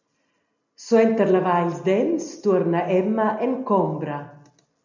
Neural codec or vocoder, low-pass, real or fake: none; 7.2 kHz; real